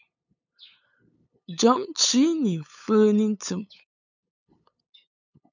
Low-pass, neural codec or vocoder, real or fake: 7.2 kHz; codec, 16 kHz, 8 kbps, FunCodec, trained on LibriTTS, 25 frames a second; fake